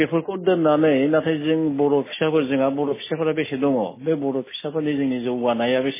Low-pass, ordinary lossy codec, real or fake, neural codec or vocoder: 3.6 kHz; MP3, 16 kbps; real; none